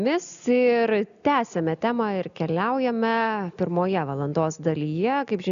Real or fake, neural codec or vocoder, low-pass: real; none; 7.2 kHz